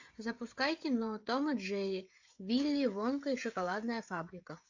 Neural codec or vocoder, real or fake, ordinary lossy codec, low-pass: codec, 16 kHz, 16 kbps, FreqCodec, smaller model; fake; AAC, 48 kbps; 7.2 kHz